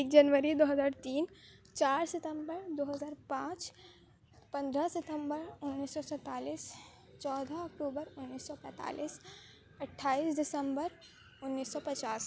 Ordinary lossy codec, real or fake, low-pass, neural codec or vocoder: none; real; none; none